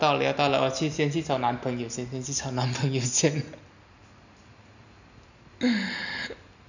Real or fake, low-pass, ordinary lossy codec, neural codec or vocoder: real; 7.2 kHz; none; none